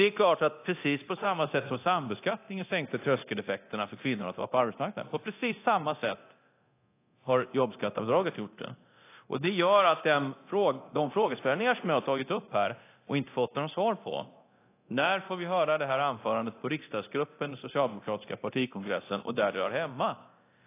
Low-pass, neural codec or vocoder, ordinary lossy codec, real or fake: 3.6 kHz; codec, 24 kHz, 0.9 kbps, DualCodec; AAC, 24 kbps; fake